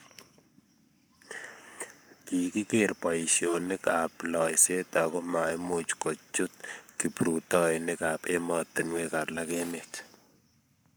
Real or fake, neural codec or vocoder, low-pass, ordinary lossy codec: fake; codec, 44.1 kHz, 7.8 kbps, Pupu-Codec; none; none